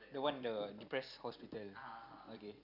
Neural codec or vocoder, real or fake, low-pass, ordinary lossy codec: vocoder, 44.1 kHz, 128 mel bands every 256 samples, BigVGAN v2; fake; 5.4 kHz; none